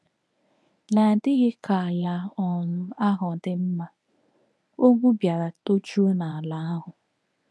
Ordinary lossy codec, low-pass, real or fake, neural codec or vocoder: none; none; fake; codec, 24 kHz, 0.9 kbps, WavTokenizer, medium speech release version 1